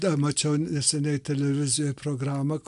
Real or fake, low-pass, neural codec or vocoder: real; 10.8 kHz; none